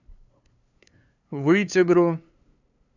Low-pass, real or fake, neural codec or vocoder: 7.2 kHz; fake; codec, 16 kHz, 2 kbps, FunCodec, trained on LibriTTS, 25 frames a second